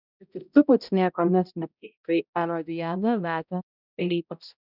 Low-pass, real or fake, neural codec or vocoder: 5.4 kHz; fake; codec, 16 kHz, 0.5 kbps, X-Codec, HuBERT features, trained on balanced general audio